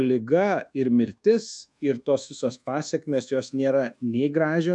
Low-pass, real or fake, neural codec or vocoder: 10.8 kHz; fake; codec, 24 kHz, 1.2 kbps, DualCodec